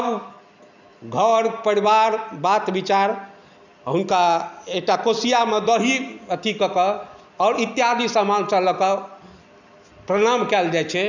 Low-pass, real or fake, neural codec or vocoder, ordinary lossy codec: 7.2 kHz; real; none; none